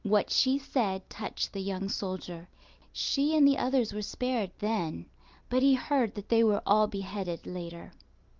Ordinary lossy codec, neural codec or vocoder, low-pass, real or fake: Opus, 32 kbps; none; 7.2 kHz; real